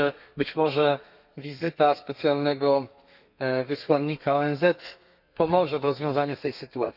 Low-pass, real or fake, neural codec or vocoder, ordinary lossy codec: 5.4 kHz; fake; codec, 32 kHz, 1.9 kbps, SNAC; none